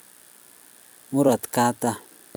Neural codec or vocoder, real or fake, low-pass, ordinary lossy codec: vocoder, 44.1 kHz, 128 mel bands every 256 samples, BigVGAN v2; fake; none; none